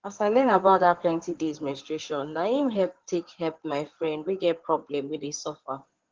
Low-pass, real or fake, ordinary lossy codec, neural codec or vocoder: 7.2 kHz; fake; Opus, 16 kbps; codec, 16 kHz in and 24 kHz out, 2.2 kbps, FireRedTTS-2 codec